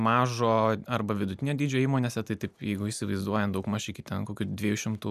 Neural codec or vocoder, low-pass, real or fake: none; 14.4 kHz; real